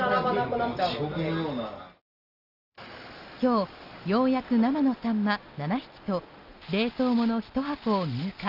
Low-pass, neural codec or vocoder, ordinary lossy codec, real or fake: 5.4 kHz; none; Opus, 32 kbps; real